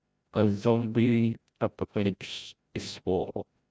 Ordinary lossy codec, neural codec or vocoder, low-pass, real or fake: none; codec, 16 kHz, 0.5 kbps, FreqCodec, larger model; none; fake